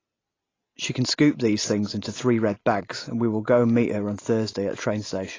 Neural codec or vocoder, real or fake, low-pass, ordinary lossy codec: none; real; 7.2 kHz; AAC, 32 kbps